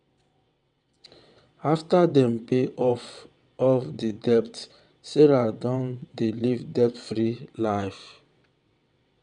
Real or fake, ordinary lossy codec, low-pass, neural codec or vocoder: fake; none; 9.9 kHz; vocoder, 22.05 kHz, 80 mel bands, WaveNeXt